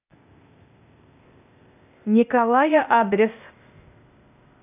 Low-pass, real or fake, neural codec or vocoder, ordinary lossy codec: 3.6 kHz; fake; codec, 16 kHz, 0.8 kbps, ZipCodec; none